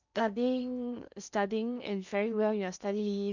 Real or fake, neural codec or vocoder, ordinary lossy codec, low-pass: fake; codec, 16 kHz in and 24 kHz out, 0.8 kbps, FocalCodec, streaming, 65536 codes; none; 7.2 kHz